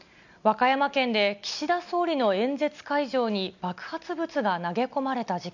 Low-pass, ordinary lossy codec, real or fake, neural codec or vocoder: 7.2 kHz; none; real; none